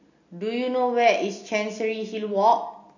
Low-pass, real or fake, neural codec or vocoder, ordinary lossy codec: 7.2 kHz; real; none; none